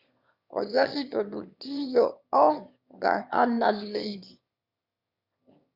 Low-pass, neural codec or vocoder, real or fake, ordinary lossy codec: 5.4 kHz; autoencoder, 22.05 kHz, a latent of 192 numbers a frame, VITS, trained on one speaker; fake; Opus, 64 kbps